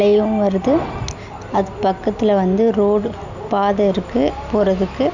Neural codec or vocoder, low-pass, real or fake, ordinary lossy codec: none; 7.2 kHz; real; none